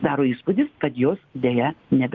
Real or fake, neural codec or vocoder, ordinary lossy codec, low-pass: real; none; Opus, 32 kbps; 7.2 kHz